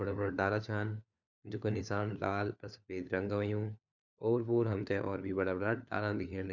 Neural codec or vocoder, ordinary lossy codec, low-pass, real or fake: codec, 16 kHz, 4 kbps, FunCodec, trained on LibriTTS, 50 frames a second; none; 7.2 kHz; fake